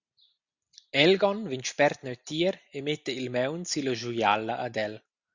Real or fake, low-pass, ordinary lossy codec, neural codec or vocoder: real; 7.2 kHz; Opus, 64 kbps; none